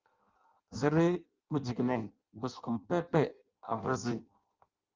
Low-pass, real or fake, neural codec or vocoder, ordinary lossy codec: 7.2 kHz; fake; codec, 16 kHz in and 24 kHz out, 0.6 kbps, FireRedTTS-2 codec; Opus, 32 kbps